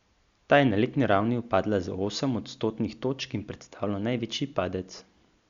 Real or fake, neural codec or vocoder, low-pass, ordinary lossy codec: real; none; 7.2 kHz; Opus, 64 kbps